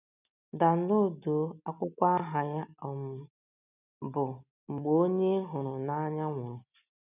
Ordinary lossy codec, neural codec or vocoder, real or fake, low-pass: none; none; real; 3.6 kHz